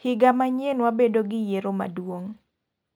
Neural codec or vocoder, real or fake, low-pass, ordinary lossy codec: none; real; none; none